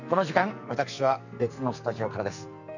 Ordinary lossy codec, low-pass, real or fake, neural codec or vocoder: none; 7.2 kHz; fake; codec, 44.1 kHz, 2.6 kbps, SNAC